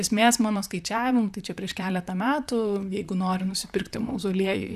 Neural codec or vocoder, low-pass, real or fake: vocoder, 44.1 kHz, 128 mel bands, Pupu-Vocoder; 14.4 kHz; fake